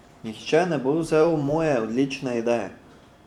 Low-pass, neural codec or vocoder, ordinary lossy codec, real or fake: 19.8 kHz; vocoder, 44.1 kHz, 128 mel bands every 512 samples, BigVGAN v2; none; fake